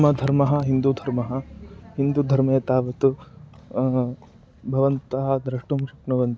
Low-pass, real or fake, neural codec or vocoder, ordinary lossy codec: none; real; none; none